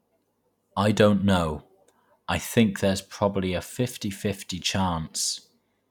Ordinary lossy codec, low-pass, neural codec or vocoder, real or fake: none; 19.8 kHz; none; real